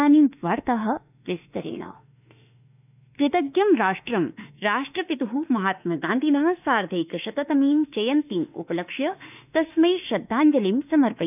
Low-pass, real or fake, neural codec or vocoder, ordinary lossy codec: 3.6 kHz; fake; autoencoder, 48 kHz, 32 numbers a frame, DAC-VAE, trained on Japanese speech; none